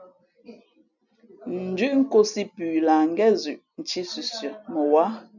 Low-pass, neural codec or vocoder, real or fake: 7.2 kHz; none; real